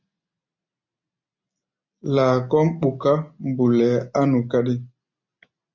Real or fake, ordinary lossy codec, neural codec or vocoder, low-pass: real; MP3, 48 kbps; none; 7.2 kHz